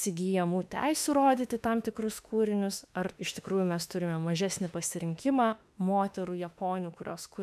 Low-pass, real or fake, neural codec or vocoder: 14.4 kHz; fake; autoencoder, 48 kHz, 32 numbers a frame, DAC-VAE, trained on Japanese speech